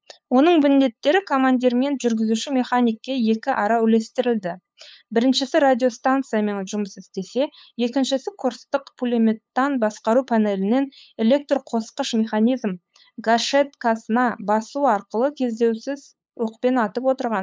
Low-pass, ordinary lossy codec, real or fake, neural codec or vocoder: none; none; fake; codec, 16 kHz, 8 kbps, FunCodec, trained on LibriTTS, 25 frames a second